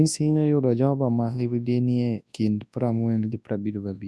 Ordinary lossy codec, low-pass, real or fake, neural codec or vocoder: none; none; fake; codec, 24 kHz, 0.9 kbps, WavTokenizer, large speech release